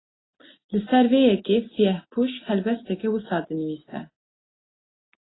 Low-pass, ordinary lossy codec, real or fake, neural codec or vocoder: 7.2 kHz; AAC, 16 kbps; real; none